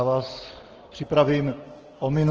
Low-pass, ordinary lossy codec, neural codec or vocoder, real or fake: 7.2 kHz; Opus, 16 kbps; none; real